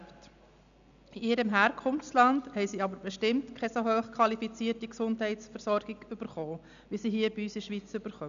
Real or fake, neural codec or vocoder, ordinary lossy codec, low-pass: real; none; none; 7.2 kHz